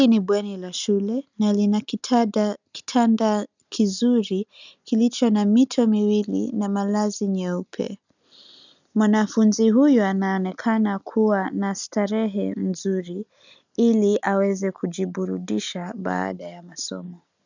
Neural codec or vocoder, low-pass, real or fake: none; 7.2 kHz; real